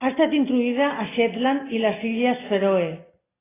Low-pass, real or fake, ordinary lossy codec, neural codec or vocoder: 3.6 kHz; real; AAC, 16 kbps; none